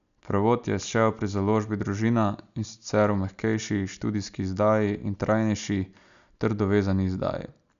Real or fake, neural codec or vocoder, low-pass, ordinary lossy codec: real; none; 7.2 kHz; none